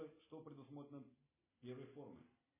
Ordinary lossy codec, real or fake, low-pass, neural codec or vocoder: MP3, 16 kbps; real; 3.6 kHz; none